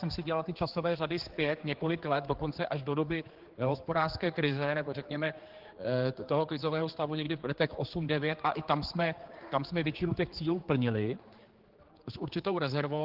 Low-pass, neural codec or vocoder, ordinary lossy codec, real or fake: 5.4 kHz; codec, 16 kHz, 4 kbps, X-Codec, HuBERT features, trained on general audio; Opus, 16 kbps; fake